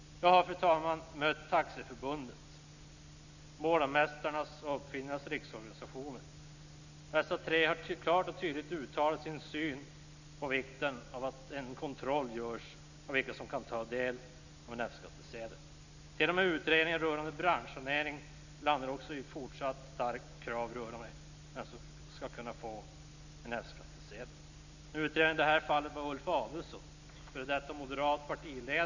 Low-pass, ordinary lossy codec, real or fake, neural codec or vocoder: 7.2 kHz; none; real; none